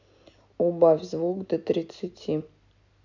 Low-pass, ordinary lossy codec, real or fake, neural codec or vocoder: 7.2 kHz; none; real; none